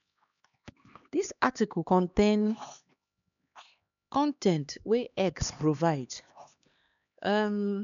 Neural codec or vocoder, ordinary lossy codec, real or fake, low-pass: codec, 16 kHz, 2 kbps, X-Codec, HuBERT features, trained on LibriSpeech; MP3, 96 kbps; fake; 7.2 kHz